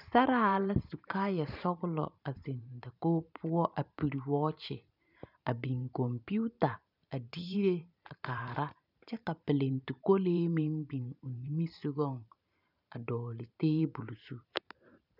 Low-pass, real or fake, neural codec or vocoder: 5.4 kHz; fake; vocoder, 44.1 kHz, 128 mel bands every 512 samples, BigVGAN v2